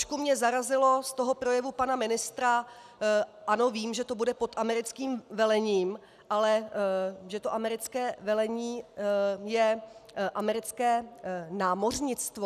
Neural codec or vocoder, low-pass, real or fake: none; 14.4 kHz; real